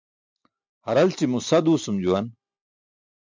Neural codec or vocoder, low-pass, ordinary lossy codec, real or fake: none; 7.2 kHz; MP3, 64 kbps; real